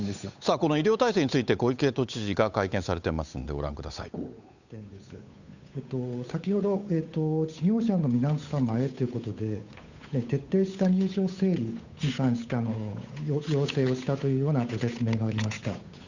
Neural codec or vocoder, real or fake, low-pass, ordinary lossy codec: codec, 16 kHz, 8 kbps, FunCodec, trained on Chinese and English, 25 frames a second; fake; 7.2 kHz; none